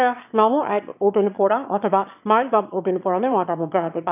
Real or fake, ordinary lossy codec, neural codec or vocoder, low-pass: fake; none; autoencoder, 22.05 kHz, a latent of 192 numbers a frame, VITS, trained on one speaker; 3.6 kHz